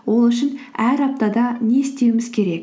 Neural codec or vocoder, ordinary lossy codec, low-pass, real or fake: none; none; none; real